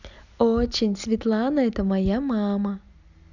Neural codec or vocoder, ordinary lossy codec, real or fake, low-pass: none; none; real; 7.2 kHz